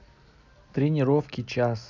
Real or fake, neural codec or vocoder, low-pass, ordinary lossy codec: real; none; 7.2 kHz; none